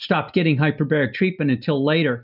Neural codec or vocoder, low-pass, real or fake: none; 5.4 kHz; real